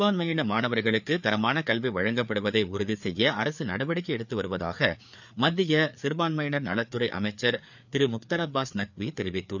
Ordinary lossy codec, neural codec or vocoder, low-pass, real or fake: none; codec, 16 kHz, 4 kbps, FreqCodec, larger model; 7.2 kHz; fake